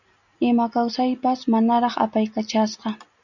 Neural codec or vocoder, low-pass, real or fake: none; 7.2 kHz; real